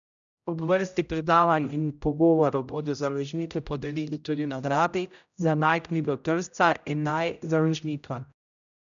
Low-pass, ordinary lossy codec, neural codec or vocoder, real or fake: 7.2 kHz; none; codec, 16 kHz, 0.5 kbps, X-Codec, HuBERT features, trained on general audio; fake